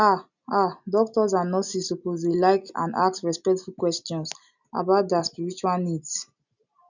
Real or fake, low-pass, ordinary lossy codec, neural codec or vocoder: real; 7.2 kHz; none; none